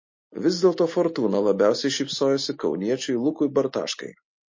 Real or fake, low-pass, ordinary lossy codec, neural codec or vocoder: real; 7.2 kHz; MP3, 32 kbps; none